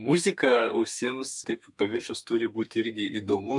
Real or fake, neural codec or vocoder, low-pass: fake; codec, 32 kHz, 1.9 kbps, SNAC; 10.8 kHz